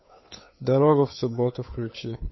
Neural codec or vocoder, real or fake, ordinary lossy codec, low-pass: codec, 16 kHz, 2 kbps, FunCodec, trained on Chinese and English, 25 frames a second; fake; MP3, 24 kbps; 7.2 kHz